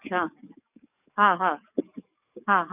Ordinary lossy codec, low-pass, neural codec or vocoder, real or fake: none; 3.6 kHz; codec, 44.1 kHz, 7.8 kbps, DAC; fake